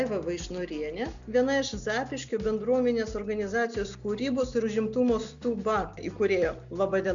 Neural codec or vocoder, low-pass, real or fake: none; 7.2 kHz; real